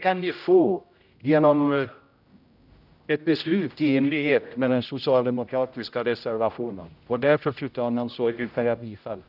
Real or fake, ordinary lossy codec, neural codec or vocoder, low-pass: fake; none; codec, 16 kHz, 0.5 kbps, X-Codec, HuBERT features, trained on general audio; 5.4 kHz